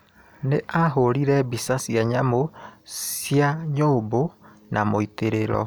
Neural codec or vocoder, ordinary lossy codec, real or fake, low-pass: none; none; real; none